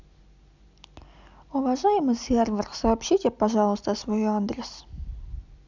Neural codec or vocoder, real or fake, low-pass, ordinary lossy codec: none; real; 7.2 kHz; none